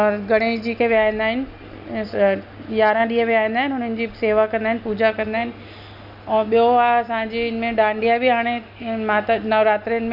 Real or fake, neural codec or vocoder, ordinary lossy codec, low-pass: real; none; none; 5.4 kHz